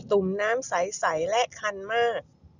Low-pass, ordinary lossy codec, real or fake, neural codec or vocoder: 7.2 kHz; none; real; none